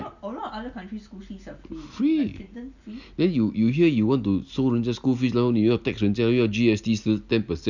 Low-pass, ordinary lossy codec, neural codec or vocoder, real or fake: 7.2 kHz; none; none; real